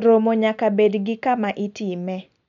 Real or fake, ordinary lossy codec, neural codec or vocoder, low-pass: real; none; none; 7.2 kHz